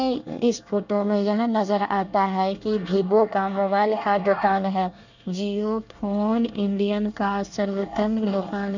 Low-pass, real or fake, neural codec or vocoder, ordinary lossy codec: 7.2 kHz; fake; codec, 24 kHz, 1 kbps, SNAC; none